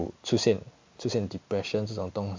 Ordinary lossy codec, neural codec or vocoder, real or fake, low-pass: MP3, 64 kbps; none; real; 7.2 kHz